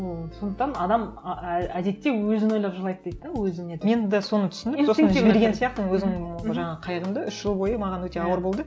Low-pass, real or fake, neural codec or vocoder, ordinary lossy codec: none; real; none; none